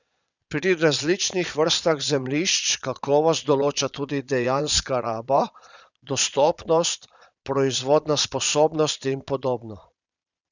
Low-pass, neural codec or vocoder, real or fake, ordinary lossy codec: 7.2 kHz; vocoder, 22.05 kHz, 80 mel bands, Vocos; fake; none